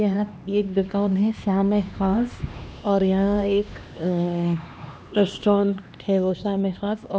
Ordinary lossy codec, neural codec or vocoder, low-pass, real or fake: none; codec, 16 kHz, 2 kbps, X-Codec, HuBERT features, trained on LibriSpeech; none; fake